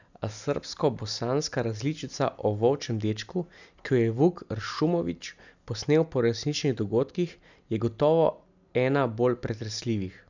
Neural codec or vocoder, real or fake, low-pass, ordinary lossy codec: none; real; 7.2 kHz; none